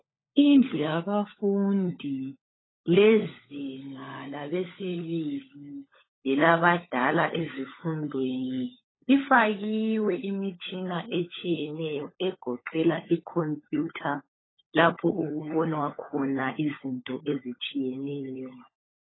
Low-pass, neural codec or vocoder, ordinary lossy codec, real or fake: 7.2 kHz; codec, 16 kHz, 16 kbps, FunCodec, trained on LibriTTS, 50 frames a second; AAC, 16 kbps; fake